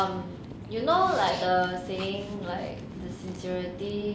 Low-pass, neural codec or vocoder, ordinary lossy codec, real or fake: none; none; none; real